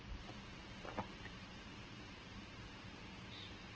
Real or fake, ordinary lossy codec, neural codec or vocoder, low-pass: fake; Opus, 16 kbps; vocoder, 44.1 kHz, 128 mel bands every 512 samples, BigVGAN v2; 7.2 kHz